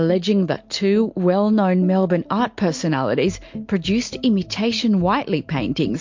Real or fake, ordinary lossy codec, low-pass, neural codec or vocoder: real; MP3, 48 kbps; 7.2 kHz; none